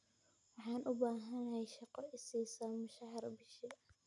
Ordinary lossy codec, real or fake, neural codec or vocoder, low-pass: none; real; none; 10.8 kHz